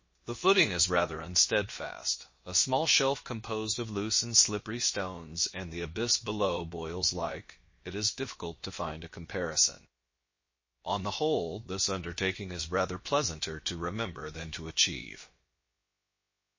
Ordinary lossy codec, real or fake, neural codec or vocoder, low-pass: MP3, 32 kbps; fake; codec, 16 kHz, about 1 kbps, DyCAST, with the encoder's durations; 7.2 kHz